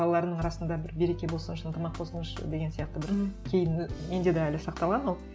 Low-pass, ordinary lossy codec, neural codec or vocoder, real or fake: none; none; none; real